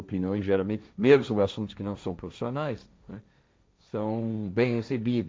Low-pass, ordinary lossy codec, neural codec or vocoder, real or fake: none; none; codec, 16 kHz, 1.1 kbps, Voila-Tokenizer; fake